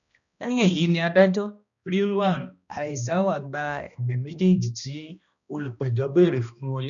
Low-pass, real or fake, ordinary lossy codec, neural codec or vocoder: 7.2 kHz; fake; none; codec, 16 kHz, 1 kbps, X-Codec, HuBERT features, trained on balanced general audio